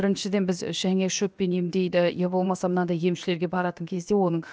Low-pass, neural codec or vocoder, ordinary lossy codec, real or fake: none; codec, 16 kHz, about 1 kbps, DyCAST, with the encoder's durations; none; fake